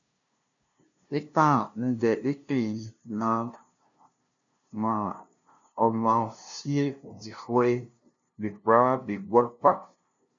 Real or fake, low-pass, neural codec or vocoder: fake; 7.2 kHz; codec, 16 kHz, 0.5 kbps, FunCodec, trained on LibriTTS, 25 frames a second